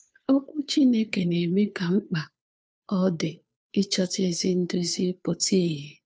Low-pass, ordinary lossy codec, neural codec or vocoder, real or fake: none; none; codec, 16 kHz, 2 kbps, FunCodec, trained on Chinese and English, 25 frames a second; fake